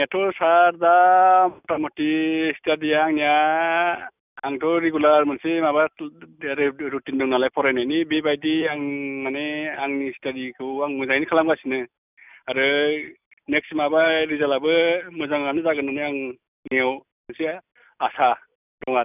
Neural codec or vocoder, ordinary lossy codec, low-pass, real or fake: none; none; 3.6 kHz; real